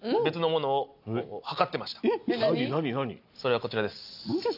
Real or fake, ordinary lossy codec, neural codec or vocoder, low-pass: real; none; none; 5.4 kHz